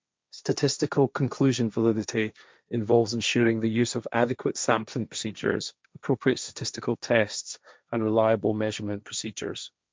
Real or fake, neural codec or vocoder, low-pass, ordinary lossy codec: fake; codec, 16 kHz, 1.1 kbps, Voila-Tokenizer; none; none